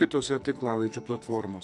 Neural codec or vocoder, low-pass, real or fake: codec, 44.1 kHz, 2.6 kbps, SNAC; 10.8 kHz; fake